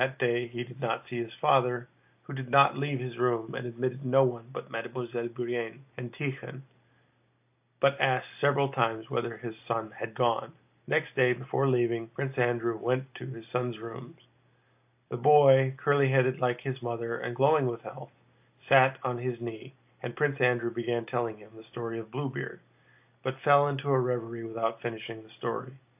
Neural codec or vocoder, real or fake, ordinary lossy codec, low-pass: none; real; AAC, 32 kbps; 3.6 kHz